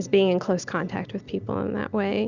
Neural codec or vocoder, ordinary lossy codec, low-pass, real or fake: none; Opus, 64 kbps; 7.2 kHz; real